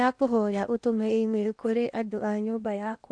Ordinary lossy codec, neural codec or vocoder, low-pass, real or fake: none; codec, 16 kHz in and 24 kHz out, 0.8 kbps, FocalCodec, streaming, 65536 codes; 9.9 kHz; fake